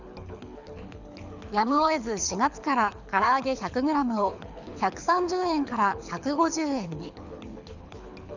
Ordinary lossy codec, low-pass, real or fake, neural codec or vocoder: none; 7.2 kHz; fake; codec, 24 kHz, 6 kbps, HILCodec